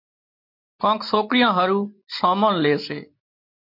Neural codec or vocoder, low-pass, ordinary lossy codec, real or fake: none; 5.4 kHz; MP3, 32 kbps; real